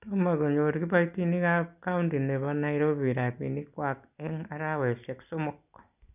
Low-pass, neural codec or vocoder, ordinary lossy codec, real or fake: 3.6 kHz; none; none; real